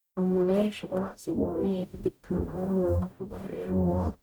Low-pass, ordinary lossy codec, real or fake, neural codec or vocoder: none; none; fake; codec, 44.1 kHz, 0.9 kbps, DAC